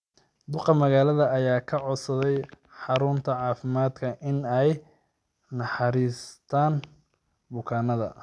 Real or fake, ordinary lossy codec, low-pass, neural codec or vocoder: real; none; none; none